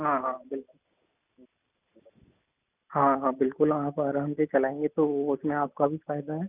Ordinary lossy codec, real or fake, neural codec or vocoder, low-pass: none; real; none; 3.6 kHz